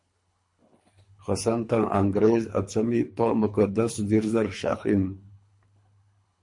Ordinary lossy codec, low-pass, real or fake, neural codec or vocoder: MP3, 48 kbps; 10.8 kHz; fake; codec, 24 kHz, 3 kbps, HILCodec